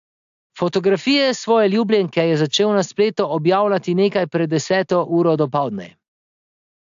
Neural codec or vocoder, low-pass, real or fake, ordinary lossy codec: none; 7.2 kHz; real; AAC, 64 kbps